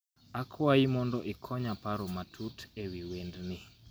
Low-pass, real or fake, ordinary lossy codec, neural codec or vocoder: none; real; none; none